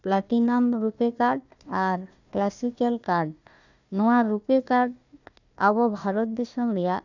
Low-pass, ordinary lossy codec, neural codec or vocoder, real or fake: 7.2 kHz; none; codec, 16 kHz, 1 kbps, FunCodec, trained on Chinese and English, 50 frames a second; fake